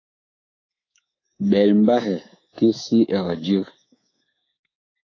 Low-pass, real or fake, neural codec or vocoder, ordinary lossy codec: 7.2 kHz; fake; codec, 24 kHz, 3.1 kbps, DualCodec; AAC, 32 kbps